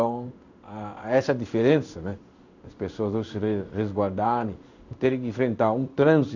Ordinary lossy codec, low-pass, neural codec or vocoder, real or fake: none; 7.2 kHz; codec, 16 kHz in and 24 kHz out, 1 kbps, XY-Tokenizer; fake